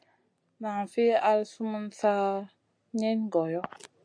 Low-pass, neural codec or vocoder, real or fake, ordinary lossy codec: 9.9 kHz; none; real; AAC, 64 kbps